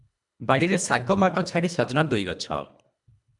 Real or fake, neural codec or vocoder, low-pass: fake; codec, 24 kHz, 1.5 kbps, HILCodec; 10.8 kHz